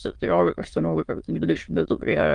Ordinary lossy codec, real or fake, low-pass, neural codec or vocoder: Opus, 24 kbps; fake; 9.9 kHz; autoencoder, 22.05 kHz, a latent of 192 numbers a frame, VITS, trained on many speakers